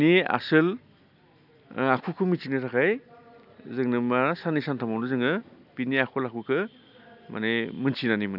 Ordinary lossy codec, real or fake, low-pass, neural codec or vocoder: none; real; 5.4 kHz; none